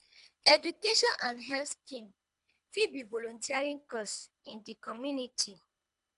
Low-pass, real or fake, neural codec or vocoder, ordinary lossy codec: 10.8 kHz; fake; codec, 24 kHz, 3 kbps, HILCodec; MP3, 96 kbps